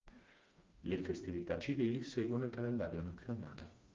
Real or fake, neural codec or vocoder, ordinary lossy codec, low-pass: fake; codec, 16 kHz, 2 kbps, FreqCodec, smaller model; Opus, 32 kbps; 7.2 kHz